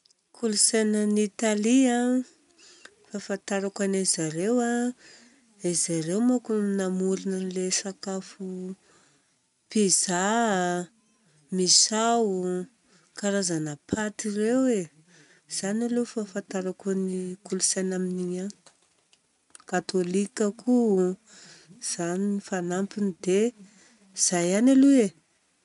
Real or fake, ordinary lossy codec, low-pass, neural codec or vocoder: real; none; 10.8 kHz; none